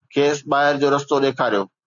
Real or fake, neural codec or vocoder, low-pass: real; none; 7.2 kHz